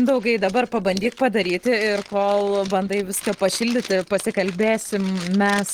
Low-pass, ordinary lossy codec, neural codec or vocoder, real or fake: 19.8 kHz; Opus, 16 kbps; none; real